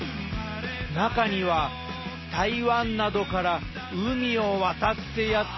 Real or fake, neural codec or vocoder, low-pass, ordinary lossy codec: real; none; 7.2 kHz; MP3, 24 kbps